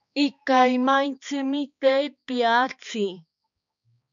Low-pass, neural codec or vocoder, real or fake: 7.2 kHz; codec, 16 kHz, 2 kbps, X-Codec, HuBERT features, trained on balanced general audio; fake